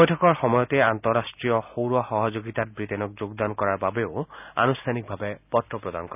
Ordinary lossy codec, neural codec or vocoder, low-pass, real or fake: none; none; 3.6 kHz; real